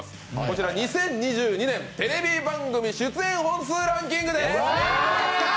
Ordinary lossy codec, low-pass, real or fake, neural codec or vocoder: none; none; real; none